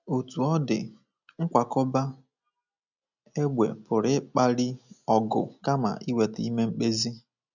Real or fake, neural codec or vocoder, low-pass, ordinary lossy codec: real; none; 7.2 kHz; none